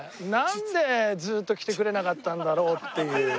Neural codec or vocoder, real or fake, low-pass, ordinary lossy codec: none; real; none; none